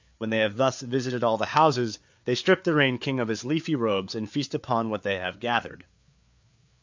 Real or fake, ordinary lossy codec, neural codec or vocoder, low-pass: fake; MP3, 64 kbps; codec, 16 kHz, 4 kbps, FreqCodec, larger model; 7.2 kHz